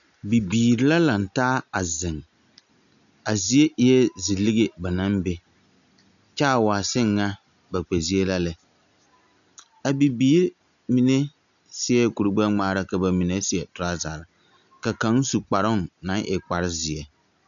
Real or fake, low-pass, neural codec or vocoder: real; 7.2 kHz; none